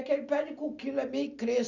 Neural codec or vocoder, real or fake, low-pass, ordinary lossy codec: none; real; 7.2 kHz; none